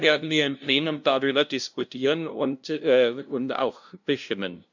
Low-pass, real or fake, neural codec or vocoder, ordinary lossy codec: 7.2 kHz; fake; codec, 16 kHz, 0.5 kbps, FunCodec, trained on LibriTTS, 25 frames a second; none